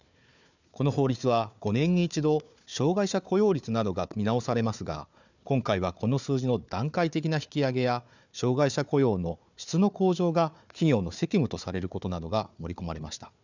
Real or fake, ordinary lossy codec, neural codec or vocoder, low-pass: fake; none; codec, 16 kHz, 4 kbps, FunCodec, trained on Chinese and English, 50 frames a second; 7.2 kHz